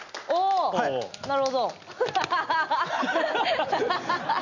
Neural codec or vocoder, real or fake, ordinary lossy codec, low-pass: none; real; none; 7.2 kHz